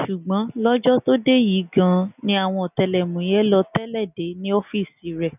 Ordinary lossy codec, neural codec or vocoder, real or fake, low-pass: none; none; real; 3.6 kHz